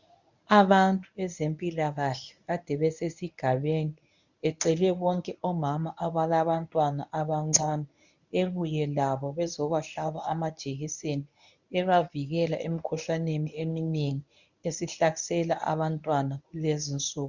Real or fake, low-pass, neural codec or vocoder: fake; 7.2 kHz; codec, 24 kHz, 0.9 kbps, WavTokenizer, medium speech release version 1